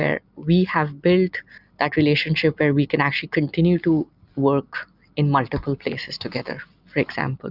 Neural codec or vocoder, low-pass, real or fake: none; 5.4 kHz; real